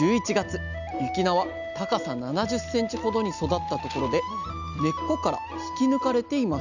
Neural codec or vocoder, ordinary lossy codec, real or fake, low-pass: none; none; real; 7.2 kHz